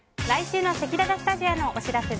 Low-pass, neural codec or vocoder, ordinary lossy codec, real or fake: none; none; none; real